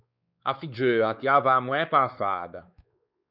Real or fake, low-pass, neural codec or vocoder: fake; 5.4 kHz; codec, 16 kHz, 4 kbps, X-Codec, WavLM features, trained on Multilingual LibriSpeech